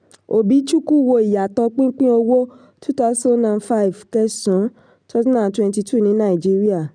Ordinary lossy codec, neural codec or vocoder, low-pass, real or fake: none; none; 9.9 kHz; real